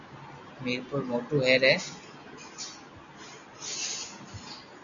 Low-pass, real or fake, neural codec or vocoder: 7.2 kHz; real; none